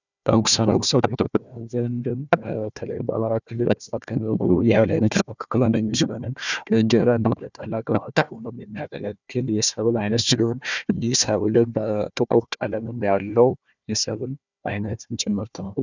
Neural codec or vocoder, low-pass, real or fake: codec, 16 kHz, 1 kbps, FunCodec, trained on Chinese and English, 50 frames a second; 7.2 kHz; fake